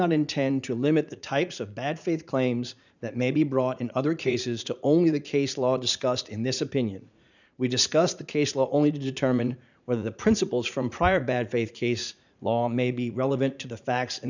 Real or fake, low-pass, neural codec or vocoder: fake; 7.2 kHz; vocoder, 44.1 kHz, 80 mel bands, Vocos